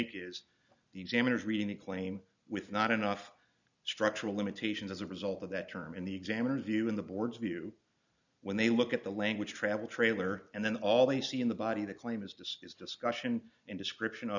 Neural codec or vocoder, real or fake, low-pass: none; real; 7.2 kHz